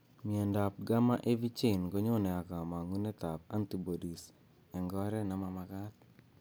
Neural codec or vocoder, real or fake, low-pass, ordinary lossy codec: none; real; none; none